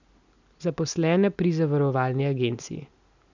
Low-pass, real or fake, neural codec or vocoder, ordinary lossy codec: 7.2 kHz; real; none; none